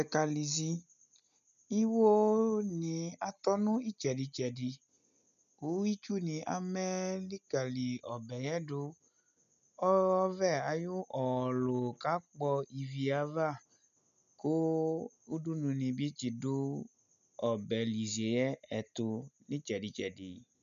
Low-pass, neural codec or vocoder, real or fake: 7.2 kHz; none; real